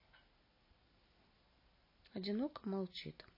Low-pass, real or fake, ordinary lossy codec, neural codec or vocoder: 5.4 kHz; real; MP3, 24 kbps; none